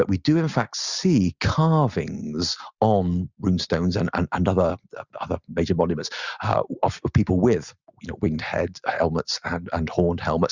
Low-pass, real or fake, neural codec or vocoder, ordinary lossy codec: 7.2 kHz; real; none; Opus, 64 kbps